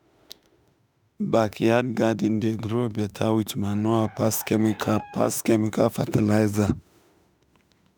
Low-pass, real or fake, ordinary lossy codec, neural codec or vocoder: none; fake; none; autoencoder, 48 kHz, 32 numbers a frame, DAC-VAE, trained on Japanese speech